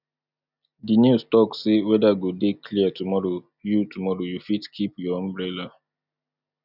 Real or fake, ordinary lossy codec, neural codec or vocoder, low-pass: real; none; none; 5.4 kHz